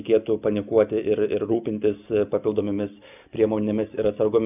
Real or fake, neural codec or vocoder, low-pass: real; none; 3.6 kHz